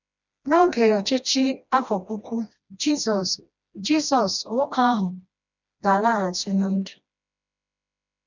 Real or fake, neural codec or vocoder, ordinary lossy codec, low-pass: fake; codec, 16 kHz, 1 kbps, FreqCodec, smaller model; none; 7.2 kHz